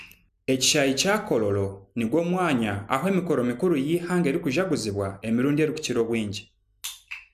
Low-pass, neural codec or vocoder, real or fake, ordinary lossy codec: 14.4 kHz; none; real; none